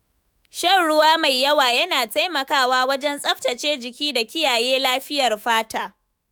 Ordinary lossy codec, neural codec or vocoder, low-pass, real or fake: none; autoencoder, 48 kHz, 128 numbers a frame, DAC-VAE, trained on Japanese speech; none; fake